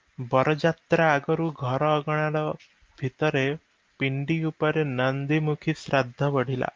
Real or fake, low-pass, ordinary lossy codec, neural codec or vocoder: real; 7.2 kHz; Opus, 24 kbps; none